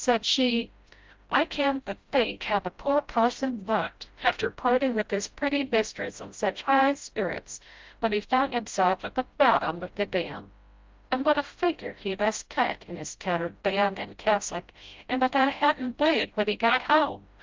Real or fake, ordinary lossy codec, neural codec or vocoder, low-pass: fake; Opus, 24 kbps; codec, 16 kHz, 0.5 kbps, FreqCodec, smaller model; 7.2 kHz